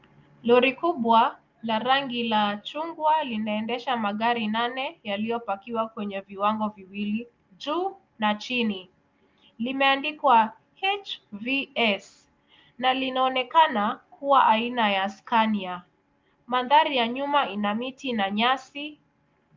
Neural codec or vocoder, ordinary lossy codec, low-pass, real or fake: none; Opus, 24 kbps; 7.2 kHz; real